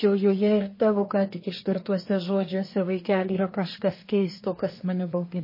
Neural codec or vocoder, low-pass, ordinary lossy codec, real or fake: codec, 24 kHz, 1 kbps, SNAC; 5.4 kHz; MP3, 24 kbps; fake